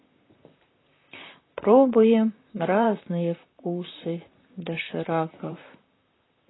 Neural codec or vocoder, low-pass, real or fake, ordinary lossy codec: vocoder, 44.1 kHz, 128 mel bands, Pupu-Vocoder; 7.2 kHz; fake; AAC, 16 kbps